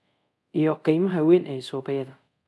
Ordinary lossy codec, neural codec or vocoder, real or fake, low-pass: none; codec, 24 kHz, 0.5 kbps, DualCodec; fake; none